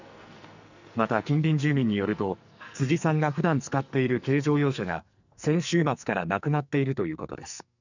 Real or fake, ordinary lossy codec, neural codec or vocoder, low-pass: fake; none; codec, 44.1 kHz, 2.6 kbps, SNAC; 7.2 kHz